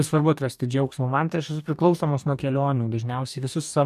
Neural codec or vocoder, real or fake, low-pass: codec, 44.1 kHz, 2.6 kbps, DAC; fake; 14.4 kHz